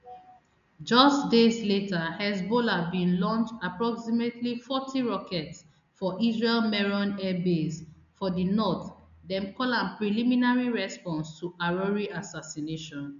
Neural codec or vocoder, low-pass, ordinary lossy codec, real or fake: none; 7.2 kHz; none; real